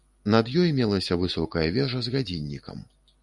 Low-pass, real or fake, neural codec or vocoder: 10.8 kHz; real; none